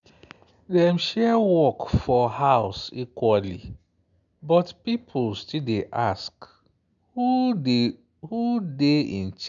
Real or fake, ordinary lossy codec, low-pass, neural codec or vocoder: real; none; 7.2 kHz; none